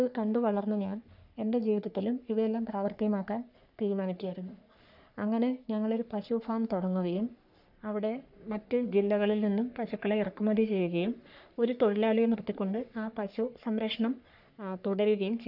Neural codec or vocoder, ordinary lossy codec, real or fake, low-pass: codec, 44.1 kHz, 3.4 kbps, Pupu-Codec; none; fake; 5.4 kHz